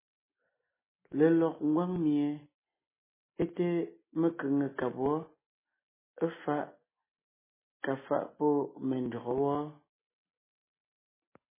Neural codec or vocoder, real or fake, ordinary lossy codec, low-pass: none; real; MP3, 16 kbps; 3.6 kHz